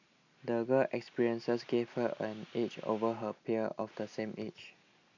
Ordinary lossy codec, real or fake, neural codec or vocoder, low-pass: none; real; none; 7.2 kHz